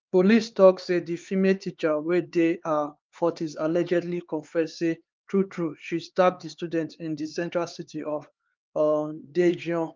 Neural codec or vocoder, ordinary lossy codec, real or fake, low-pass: codec, 16 kHz, 4 kbps, X-Codec, HuBERT features, trained on LibriSpeech; Opus, 24 kbps; fake; 7.2 kHz